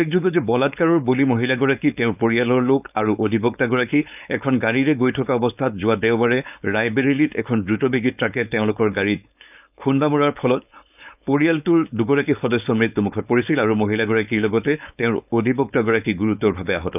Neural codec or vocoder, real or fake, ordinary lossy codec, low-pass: codec, 16 kHz, 4.8 kbps, FACodec; fake; none; 3.6 kHz